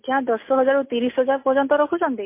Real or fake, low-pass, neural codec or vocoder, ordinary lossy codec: real; 3.6 kHz; none; MP3, 24 kbps